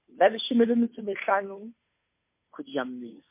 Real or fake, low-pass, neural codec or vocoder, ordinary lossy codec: fake; 3.6 kHz; codec, 16 kHz, 2 kbps, FunCodec, trained on Chinese and English, 25 frames a second; MP3, 24 kbps